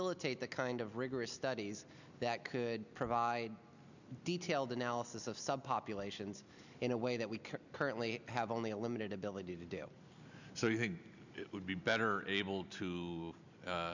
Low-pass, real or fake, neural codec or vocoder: 7.2 kHz; real; none